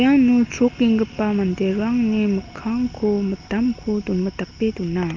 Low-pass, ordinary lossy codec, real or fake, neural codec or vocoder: 7.2 kHz; Opus, 24 kbps; real; none